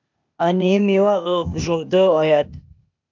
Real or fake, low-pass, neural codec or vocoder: fake; 7.2 kHz; codec, 16 kHz, 0.8 kbps, ZipCodec